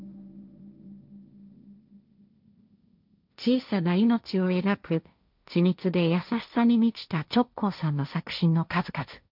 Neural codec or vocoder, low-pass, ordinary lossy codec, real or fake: codec, 16 kHz, 1.1 kbps, Voila-Tokenizer; 5.4 kHz; none; fake